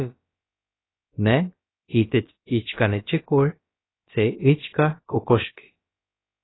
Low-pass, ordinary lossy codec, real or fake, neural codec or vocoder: 7.2 kHz; AAC, 16 kbps; fake; codec, 16 kHz, about 1 kbps, DyCAST, with the encoder's durations